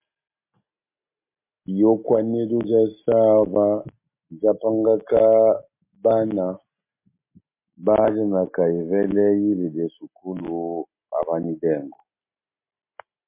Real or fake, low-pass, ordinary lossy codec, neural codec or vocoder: real; 3.6 kHz; MP3, 24 kbps; none